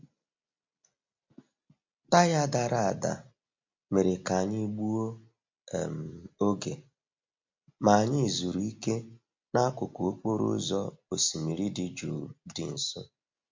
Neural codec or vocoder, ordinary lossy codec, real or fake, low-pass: none; MP3, 48 kbps; real; 7.2 kHz